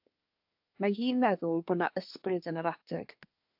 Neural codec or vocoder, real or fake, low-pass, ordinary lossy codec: codec, 24 kHz, 1 kbps, SNAC; fake; 5.4 kHz; AAC, 48 kbps